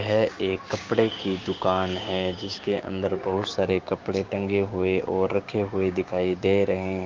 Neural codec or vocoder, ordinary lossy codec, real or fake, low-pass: codec, 16 kHz, 6 kbps, DAC; Opus, 32 kbps; fake; 7.2 kHz